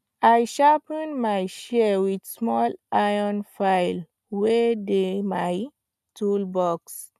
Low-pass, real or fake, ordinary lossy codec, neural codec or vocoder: 14.4 kHz; real; none; none